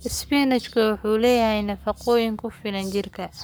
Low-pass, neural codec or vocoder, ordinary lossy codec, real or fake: none; codec, 44.1 kHz, 7.8 kbps, Pupu-Codec; none; fake